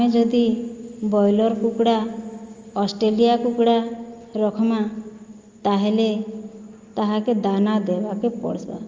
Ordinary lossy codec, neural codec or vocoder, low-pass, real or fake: Opus, 32 kbps; none; 7.2 kHz; real